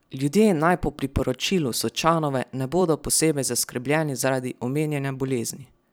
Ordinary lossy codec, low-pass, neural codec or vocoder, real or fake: none; none; vocoder, 44.1 kHz, 128 mel bands every 256 samples, BigVGAN v2; fake